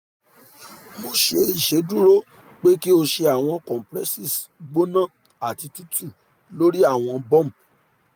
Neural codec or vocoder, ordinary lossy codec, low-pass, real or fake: none; none; none; real